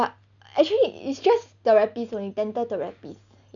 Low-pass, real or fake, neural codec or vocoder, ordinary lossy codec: 7.2 kHz; real; none; none